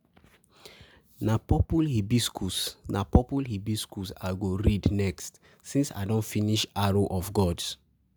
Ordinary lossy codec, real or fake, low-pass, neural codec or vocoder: none; real; none; none